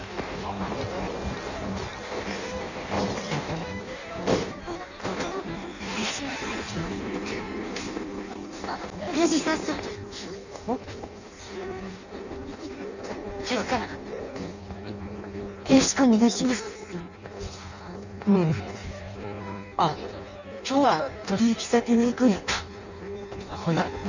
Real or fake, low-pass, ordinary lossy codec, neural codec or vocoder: fake; 7.2 kHz; none; codec, 16 kHz in and 24 kHz out, 0.6 kbps, FireRedTTS-2 codec